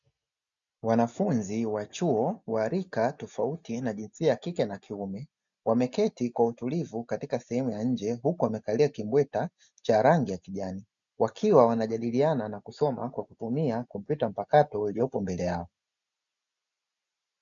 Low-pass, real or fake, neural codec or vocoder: 7.2 kHz; real; none